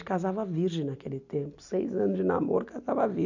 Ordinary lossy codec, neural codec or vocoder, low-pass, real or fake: none; none; 7.2 kHz; real